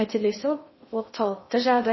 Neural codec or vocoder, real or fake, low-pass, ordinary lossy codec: codec, 16 kHz in and 24 kHz out, 0.6 kbps, FocalCodec, streaming, 2048 codes; fake; 7.2 kHz; MP3, 24 kbps